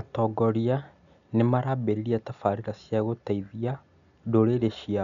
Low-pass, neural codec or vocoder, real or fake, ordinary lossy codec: 7.2 kHz; none; real; none